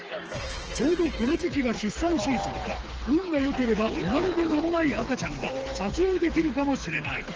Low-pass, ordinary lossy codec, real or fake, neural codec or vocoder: 7.2 kHz; Opus, 16 kbps; fake; codec, 16 kHz, 4 kbps, FreqCodec, smaller model